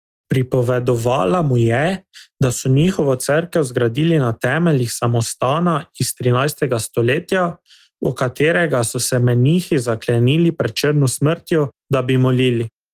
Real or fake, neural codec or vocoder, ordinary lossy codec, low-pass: real; none; Opus, 24 kbps; 14.4 kHz